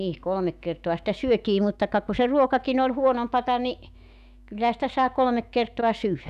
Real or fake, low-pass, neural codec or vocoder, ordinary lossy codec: fake; 14.4 kHz; autoencoder, 48 kHz, 128 numbers a frame, DAC-VAE, trained on Japanese speech; none